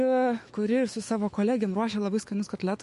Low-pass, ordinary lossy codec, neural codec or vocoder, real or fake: 14.4 kHz; MP3, 48 kbps; autoencoder, 48 kHz, 128 numbers a frame, DAC-VAE, trained on Japanese speech; fake